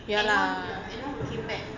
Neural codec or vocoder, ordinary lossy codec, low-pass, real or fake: none; none; 7.2 kHz; real